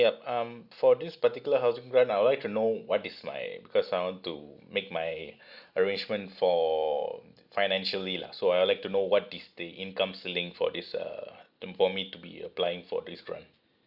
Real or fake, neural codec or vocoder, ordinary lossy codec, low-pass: real; none; Opus, 64 kbps; 5.4 kHz